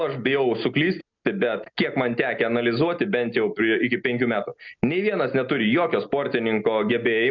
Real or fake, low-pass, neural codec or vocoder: real; 7.2 kHz; none